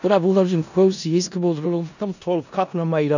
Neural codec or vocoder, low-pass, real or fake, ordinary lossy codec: codec, 16 kHz in and 24 kHz out, 0.4 kbps, LongCat-Audio-Codec, four codebook decoder; 7.2 kHz; fake; none